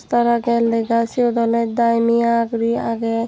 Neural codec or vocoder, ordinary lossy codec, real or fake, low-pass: none; none; real; none